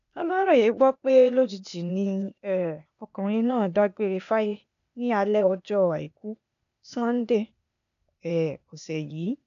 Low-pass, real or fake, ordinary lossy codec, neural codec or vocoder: 7.2 kHz; fake; none; codec, 16 kHz, 0.8 kbps, ZipCodec